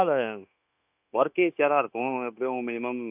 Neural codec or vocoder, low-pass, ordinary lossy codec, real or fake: codec, 16 kHz, 0.9 kbps, LongCat-Audio-Codec; 3.6 kHz; none; fake